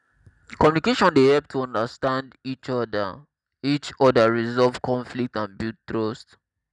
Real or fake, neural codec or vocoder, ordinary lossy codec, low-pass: real; none; none; 10.8 kHz